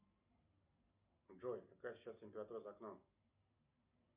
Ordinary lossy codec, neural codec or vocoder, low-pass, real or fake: Opus, 64 kbps; none; 3.6 kHz; real